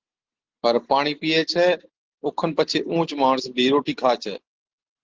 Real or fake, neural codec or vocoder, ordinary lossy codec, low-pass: real; none; Opus, 16 kbps; 7.2 kHz